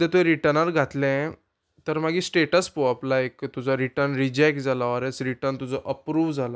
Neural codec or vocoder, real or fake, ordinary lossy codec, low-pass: none; real; none; none